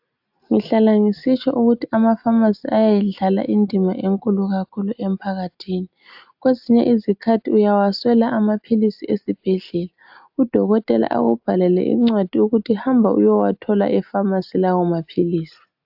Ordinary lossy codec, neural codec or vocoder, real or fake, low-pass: AAC, 48 kbps; none; real; 5.4 kHz